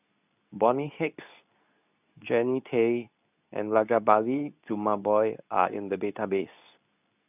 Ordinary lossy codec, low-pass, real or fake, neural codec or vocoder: none; 3.6 kHz; fake; codec, 24 kHz, 0.9 kbps, WavTokenizer, medium speech release version 2